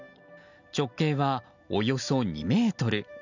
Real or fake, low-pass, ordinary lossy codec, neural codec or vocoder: real; 7.2 kHz; none; none